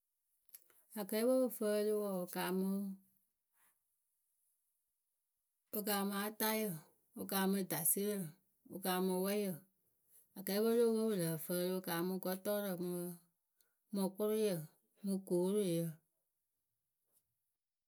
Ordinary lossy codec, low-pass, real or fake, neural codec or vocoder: none; none; real; none